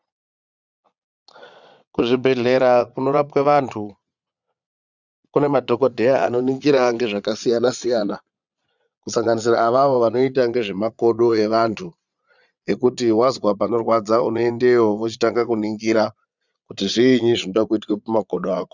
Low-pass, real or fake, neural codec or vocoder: 7.2 kHz; fake; vocoder, 22.05 kHz, 80 mel bands, Vocos